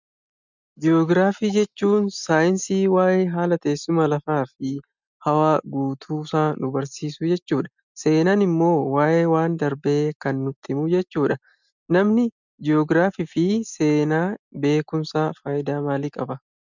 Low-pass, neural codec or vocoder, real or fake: 7.2 kHz; none; real